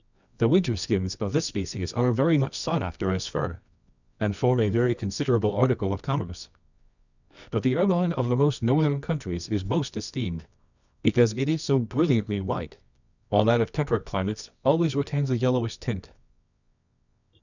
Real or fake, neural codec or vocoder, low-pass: fake; codec, 24 kHz, 0.9 kbps, WavTokenizer, medium music audio release; 7.2 kHz